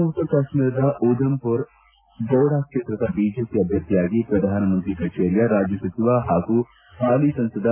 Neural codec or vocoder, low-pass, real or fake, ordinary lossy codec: none; 3.6 kHz; real; AAC, 24 kbps